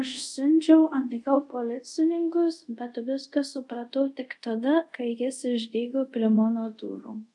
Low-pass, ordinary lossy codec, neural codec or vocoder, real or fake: 10.8 kHz; MP3, 96 kbps; codec, 24 kHz, 0.5 kbps, DualCodec; fake